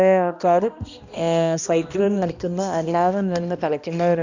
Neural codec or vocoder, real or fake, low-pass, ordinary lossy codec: codec, 16 kHz, 1 kbps, X-Codec, HuBERT features, trained on balanced general audio; fake; 7.2 kHz; none